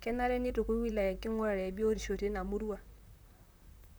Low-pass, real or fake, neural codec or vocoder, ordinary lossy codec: none; fake; vocoder, 44.1 kHz, 128 mel bands, Pupu-Vocoder; none